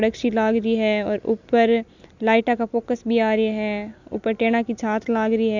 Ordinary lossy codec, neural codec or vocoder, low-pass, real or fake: none; none; 7.2 kHz; real